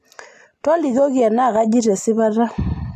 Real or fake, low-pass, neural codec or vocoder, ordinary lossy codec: real; 14.4 kHz; none; AAC, 64 kbps